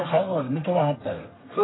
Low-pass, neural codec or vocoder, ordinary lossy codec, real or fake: 7.2 kHz; codec, 32 kHz, 1.9 kbps, SNAC; AAC, 16 kbps; fake